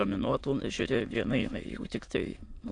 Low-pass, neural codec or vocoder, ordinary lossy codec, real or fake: 9.9 kHz; autoencoder, 22.05 kHz, a latent of 192 numbers a frame, VITS, trained on many speakers; AAC, 48 kbps; fake